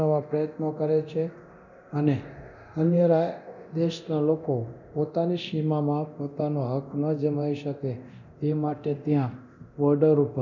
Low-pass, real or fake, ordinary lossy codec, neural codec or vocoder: 7.2 kHz; fake; none; codec, 24 kHz, 0.9 kbps, DualCodec